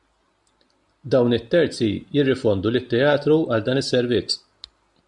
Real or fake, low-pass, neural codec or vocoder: real; 10.8 kHz; none